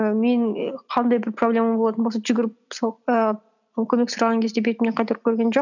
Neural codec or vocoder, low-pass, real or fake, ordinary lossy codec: none; 7.2 kHz; real; none